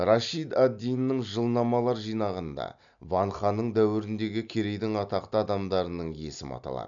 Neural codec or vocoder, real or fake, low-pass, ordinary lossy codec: none; real; 7.2 kHz; none